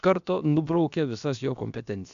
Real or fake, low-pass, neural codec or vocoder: fake; 7.2 kHz; codec, 16 kHz, about 1 kbps, DyCAST, with the encoder's durations